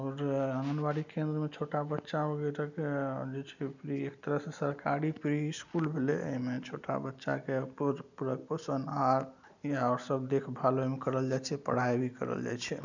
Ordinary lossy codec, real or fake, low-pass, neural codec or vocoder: none; real; 7.2 kHz; none